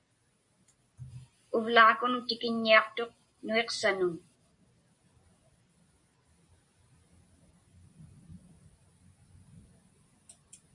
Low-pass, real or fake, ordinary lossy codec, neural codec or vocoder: 10.8 kHz; real; MP3, 48 kbps; none